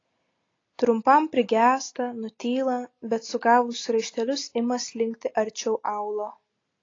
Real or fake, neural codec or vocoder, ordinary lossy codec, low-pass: real; none; AAC, 32 kbps; 7.2 kHz